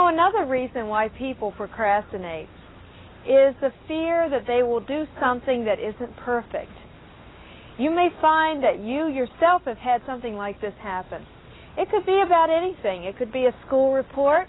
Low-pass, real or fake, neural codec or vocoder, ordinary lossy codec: 7.2 kHz; real; none; AAC, 16 kbps